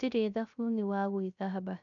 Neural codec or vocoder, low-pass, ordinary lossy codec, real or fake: codec, 16 kHz, 0.3 kbps, FocalCodec; 7.2 kHz; none; fake